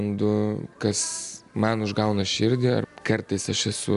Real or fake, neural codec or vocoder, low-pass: real; none; 10.8 kHz